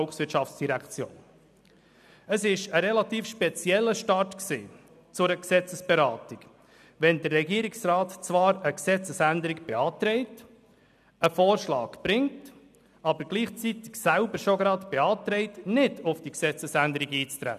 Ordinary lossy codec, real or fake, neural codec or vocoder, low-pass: none; real; none; 14.4 kHz